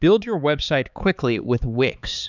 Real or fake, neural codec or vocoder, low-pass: fake; codec, 16 kHz, 4 kbps, X-Codec, HuBERT features, trained on balanced general audio; 7.2 kHz